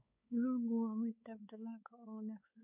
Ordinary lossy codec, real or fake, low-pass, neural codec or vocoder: none; fake; 3.6 kHz; codec, 16 kHz, 4 kbps, X-Codec, WavLM features, trained on Multilingual LibriSpeech